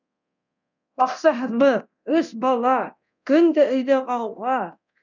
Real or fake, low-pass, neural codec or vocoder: fake; 7.2 kHz; codec, 24 kHz, 0.9 kbps, DualCodec